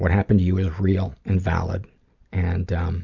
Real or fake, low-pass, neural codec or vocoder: real; 7.2 kHz; none